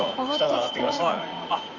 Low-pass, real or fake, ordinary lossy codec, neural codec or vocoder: 7.2 kHz; fake; Opus, 64 kbps; vocoder, 44.1 kHz, 80 mel bands, Vocos